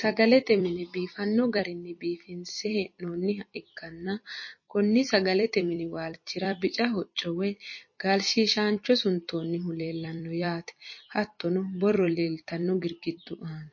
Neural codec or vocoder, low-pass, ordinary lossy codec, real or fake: vocoder, 44.1 kHz, 128 mel bands every 256 samples, BigVGAN v2; 7.2 kHz; MP3, 32 kbps; fake